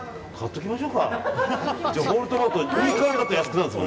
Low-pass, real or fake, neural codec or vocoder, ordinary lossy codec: none; real; none; none